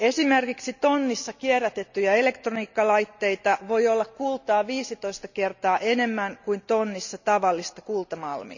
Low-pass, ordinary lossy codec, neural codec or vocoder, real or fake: 7.2 kHz; none; none; real